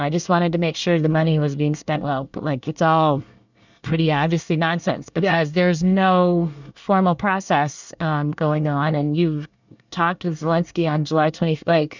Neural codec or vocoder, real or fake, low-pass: codec, 24 kHz, 1 kbps, SNAC; fake; 7.2 kHz